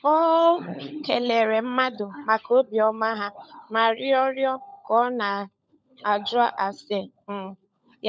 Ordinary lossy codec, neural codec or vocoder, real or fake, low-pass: none; codec, 16 kHz, 16 kbps, FunCodec, trained on LibriTTS, 50 frames a second; fake; none